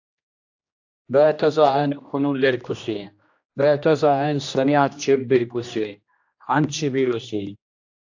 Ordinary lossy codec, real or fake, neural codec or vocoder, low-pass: AAC, 48 kbps; fake; codec, 16 kHz, 1 kbps, X-Codec, HuBERT features, trained on general audio; 7.2 kHz